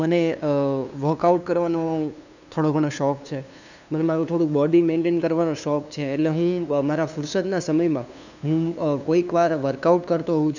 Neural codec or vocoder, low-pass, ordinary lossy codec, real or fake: codec, 16 kHz, 2 kbps, FunCodec, trained on LibriTTS, 25 frames a second; 7.2 kHz; none; fake